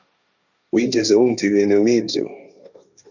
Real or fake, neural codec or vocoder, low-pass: fake; codec, 16 kHz, 1.1 kbps, Voila-Tokenizer; 7.2 kHz